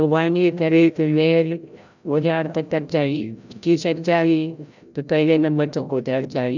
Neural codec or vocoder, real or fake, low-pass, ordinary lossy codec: codec, 16 kHz, 0.5 kbps, FreqCodec, larger model; fake; 7.2 kHz; none